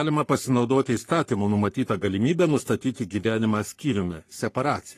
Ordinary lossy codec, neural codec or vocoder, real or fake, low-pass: AAC, 48 kbps; codec, 44.1 kHz, 3.4 kbps, Pupu-Codec; fake; 14.4 kHz